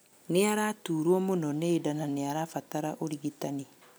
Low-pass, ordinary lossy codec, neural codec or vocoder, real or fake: none; none; none; real